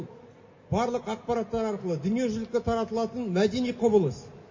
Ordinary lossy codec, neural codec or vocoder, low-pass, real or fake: MP3, 32 kbps; none; 7.2 kHz; real